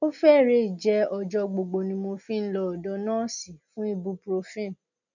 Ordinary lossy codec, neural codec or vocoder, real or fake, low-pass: none; none; real; 7.2 kHz